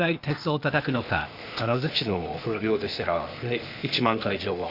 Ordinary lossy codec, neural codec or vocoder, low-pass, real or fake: none; codec, 16 kHz, 0.8 kbps, ZipCodec; 5.4 kHz; fake